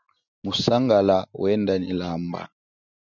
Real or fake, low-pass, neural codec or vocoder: real; 7.2 kHz; none